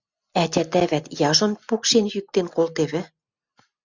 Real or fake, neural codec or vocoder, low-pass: real; none; 7.2 kHz